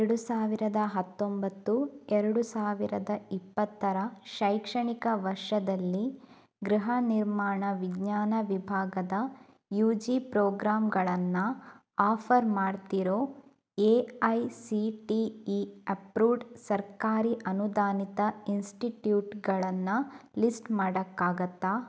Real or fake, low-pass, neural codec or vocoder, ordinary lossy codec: real; none; none; none